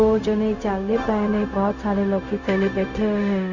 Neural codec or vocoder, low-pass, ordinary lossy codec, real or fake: codec, 16 kHz, 0.4 kbps, LongCat-Audio-Codec; 7.2 kHz; none; fake